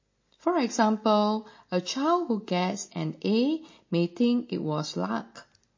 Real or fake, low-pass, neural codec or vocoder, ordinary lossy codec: real; 7.2 kHz; none; MP3, 32 kbps